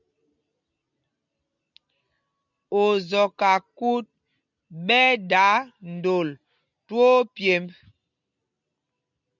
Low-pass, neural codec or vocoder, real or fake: 7.2 kHz; none; real